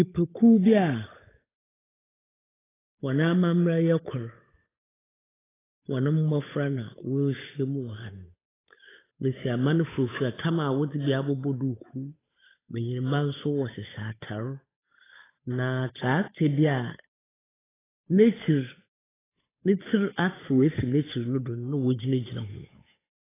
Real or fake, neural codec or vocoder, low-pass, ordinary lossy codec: fake; codec, 16 kHz, 8 kbps, FunCodec, trained on Chinese and English, 25 frames a second; 3.6 kHz; AAC, 16 kbps